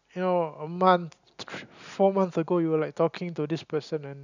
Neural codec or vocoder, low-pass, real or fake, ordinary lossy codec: none; 7.2 kHz; real; none